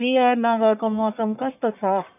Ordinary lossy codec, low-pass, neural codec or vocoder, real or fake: none; 3.6 kHz; codec, 44.1 kHz, 1.7 kbps, Pupu-Codec; fake